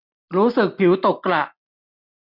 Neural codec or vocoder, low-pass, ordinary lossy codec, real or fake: none; 5.4 kHz; none; real